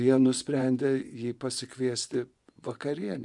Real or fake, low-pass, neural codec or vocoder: fake; 10.8 kHz; vocoder, 24 kHz, 100 mel bands, Vocos